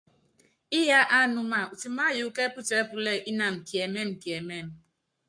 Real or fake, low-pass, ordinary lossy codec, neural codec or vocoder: fake; 9.9 kHz; MP3, 64 kbps; codec, 44.1 kHz, 7.8 kbps, Pupu-Codec